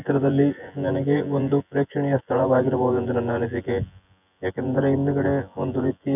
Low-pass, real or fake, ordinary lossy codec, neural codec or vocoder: 3.6 kHz; fake; none; vocoder, 24 kHz, 100 mel bands, Vocos